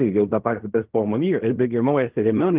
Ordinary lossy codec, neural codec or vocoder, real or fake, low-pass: Opus, 32 kbps; codec, 16 kHz in and 24 kHz out, 0.4 kbps, LongCat-Audio-Codec, fine tuned four codebook decoder; fake; 3.6 kHz